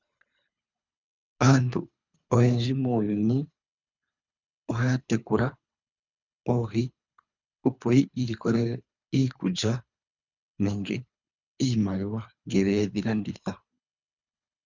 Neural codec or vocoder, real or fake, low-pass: codec, 24 kHz, 3 kbps, HILCodec; fake; 7.2 kHz